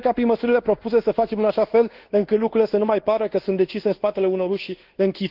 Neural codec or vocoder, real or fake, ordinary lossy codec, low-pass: codec, 24 kHz, 1.2 kbps, DualCodec; fake; Opus, 16 kbps; 5.4 kHz